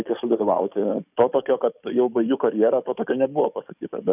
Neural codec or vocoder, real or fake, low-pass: codec, 44.1 kHz, 7.8 kbps, DAC; fake; 3.6 kHz